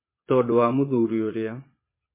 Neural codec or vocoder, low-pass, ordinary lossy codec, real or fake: vocoder, 22.05 kHz, 80 mel bands, Vocos; 3.6 kHz; MP3, 16 kbps; fake